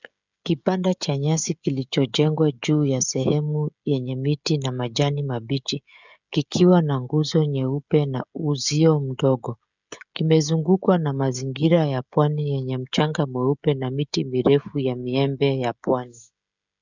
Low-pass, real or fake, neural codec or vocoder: 7.2 kHz; fake; codec, 16 kHz, 16 kbps, FreqCodec, smaller model